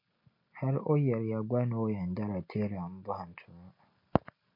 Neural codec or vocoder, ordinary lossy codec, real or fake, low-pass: none; MP3, 32 kbps; real; 5.4 kHz